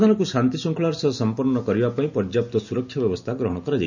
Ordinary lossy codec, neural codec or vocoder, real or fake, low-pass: none; none; real; 7.2 kHz